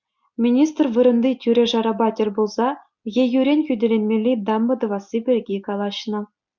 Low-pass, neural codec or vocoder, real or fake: 7.2 kHz; none; real